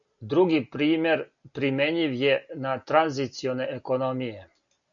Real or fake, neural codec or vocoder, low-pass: real; none; 7.2 kHz